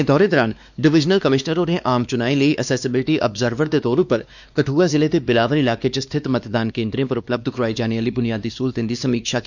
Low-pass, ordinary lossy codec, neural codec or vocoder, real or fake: 7.2 kHz; none; codec, 16 kHz, 2 kbps, X-Codec, WavLM features, trained on Multilingual LibriSpeech; fake